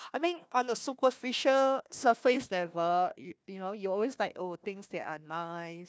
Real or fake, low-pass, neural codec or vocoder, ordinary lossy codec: fake; none; codec, 16 kHz, 1 kbps, FunCodec, trained on Chinese and English, 50 frames a second; none